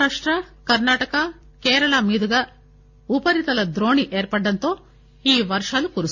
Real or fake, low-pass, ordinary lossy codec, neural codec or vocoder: real; 7.2 kHz; Opus, 64 kbps; none